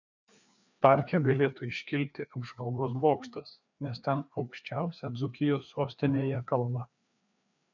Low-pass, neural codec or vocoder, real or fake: 7.2 kHz; codec, 16 kHz, 2 kbps, FreqCodec, larger model; fake